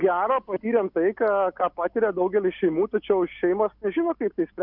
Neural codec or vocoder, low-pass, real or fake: none; 5.4 kHz; real